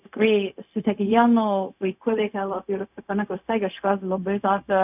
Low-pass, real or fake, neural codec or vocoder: 3.6 kHz; fake; codec, 16 kHz, 0.4 kbps, LongCat-Audio-Codec